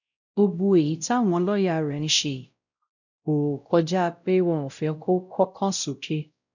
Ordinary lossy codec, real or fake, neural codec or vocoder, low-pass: none; fake; codec, 16 kHz, 0.5 kbps, X-Codec, WavLM features, trained on Multilingual LibriSpeech; 7.2 kHz